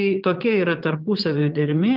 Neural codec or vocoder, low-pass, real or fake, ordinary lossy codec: vocoder, 22.05 kHz, 80 mel bands, Vocos; 5.4 kHz; fake; Opus, 32 kbps